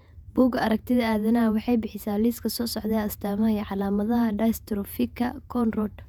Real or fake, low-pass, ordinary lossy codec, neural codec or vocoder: fake; 19.8 kHz; none; vocoder, 48 kHz, 128 mel bands, Vocos